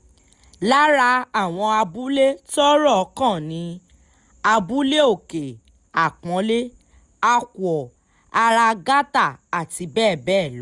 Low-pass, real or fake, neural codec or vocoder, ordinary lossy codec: 10.8 kHz; real; none; none